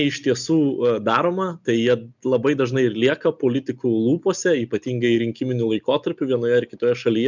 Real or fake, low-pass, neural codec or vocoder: real; 7.2 kHz; none